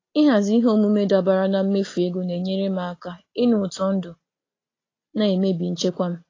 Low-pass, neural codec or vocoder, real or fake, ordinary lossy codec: 7.2 kHz; none; real; AAC, 32 kbps